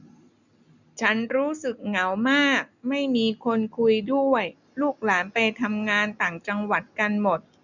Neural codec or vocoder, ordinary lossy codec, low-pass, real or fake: none; none; 7.2 kHz; real